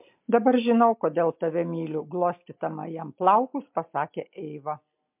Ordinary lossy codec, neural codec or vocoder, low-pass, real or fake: MP3, 32 kbps; none; 3.6 kHz; real